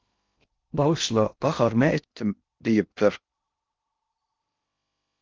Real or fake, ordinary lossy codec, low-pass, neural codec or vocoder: fake; Opus, 24 kbps; 7.2 kHz; codec, 16 kHz in and 24 kHz out, 0.6 kbps, FocalCodec, streaming, 2048 codes